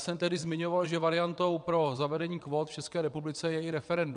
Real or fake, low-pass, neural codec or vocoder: fake; 9.9 kHz; vocoder, 22.05 kHz, 80 mel bands, WaveNeXt